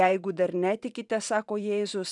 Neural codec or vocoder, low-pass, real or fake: vocoder, 44.1 kHz, 128 mel bands every 512 samples, BigVGAN v2; 10.8 kHz; fake